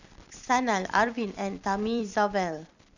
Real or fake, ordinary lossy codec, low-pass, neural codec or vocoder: fake; none; 7.2 kHz; vocoder, 44.1 kHz, 128 mel bands every 256 samples, BigVGAN v2